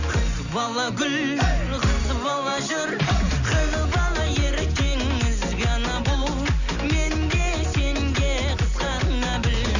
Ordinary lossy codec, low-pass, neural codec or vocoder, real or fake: none; 7.2 kHz; none; real